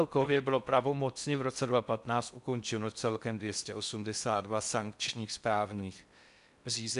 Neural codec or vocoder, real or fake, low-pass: codec, 16 kHz in and 24 kHz out, 0.6 kbps, FocalCodec, streaming, 4096 codes; fake; 10.8 kHz